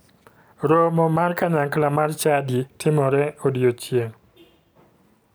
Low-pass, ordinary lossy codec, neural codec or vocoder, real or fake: none; none; none; real